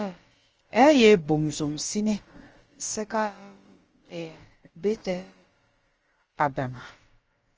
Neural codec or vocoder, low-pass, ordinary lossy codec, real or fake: codec, 16 kHz, about 1 kbps, DyCAST, with the encoder's durations; 7.2 kHz; Opus, 24 kbps; fake